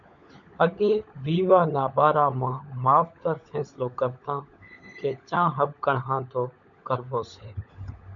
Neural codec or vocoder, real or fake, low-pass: codec, 16 kHz, 16 kbps, FunCodec, trained on LibriTTS, 50 frames a second; fake; 7.2 kHz